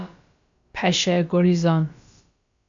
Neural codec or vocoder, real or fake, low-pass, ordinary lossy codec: codec, 16 kHz, about 1 kbps, DyCAST, with the encoder's durations; fake; 7.2 kHz; AAC, 64 kbps